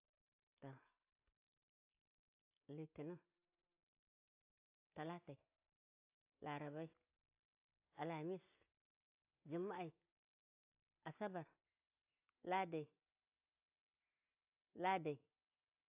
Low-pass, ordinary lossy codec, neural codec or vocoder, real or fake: 3.6 kHz; AAC, 32 kbps; none; real